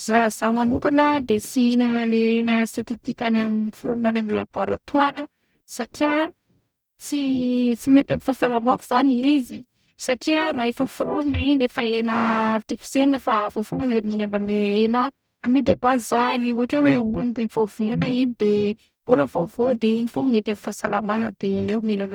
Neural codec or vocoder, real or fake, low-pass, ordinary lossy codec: codec, 44.1 kHz, 0.9 kbps, DAC; fake; none; none